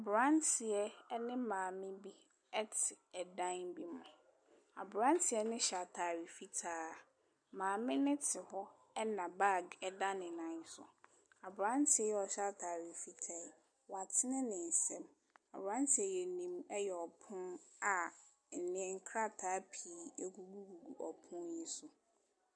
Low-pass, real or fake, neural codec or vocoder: 9.9 kHz; real; none